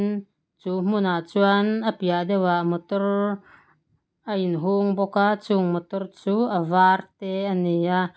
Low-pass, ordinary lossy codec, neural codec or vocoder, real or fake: none; none; none; real